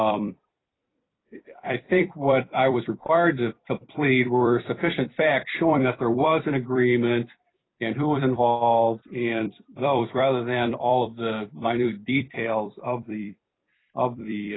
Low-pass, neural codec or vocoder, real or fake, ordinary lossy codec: 7.2 kHz; vocoder, 44.1 kHz, 128 mel bands every 256 samples, BigVGAN v2; fake; AAC, 16 kbps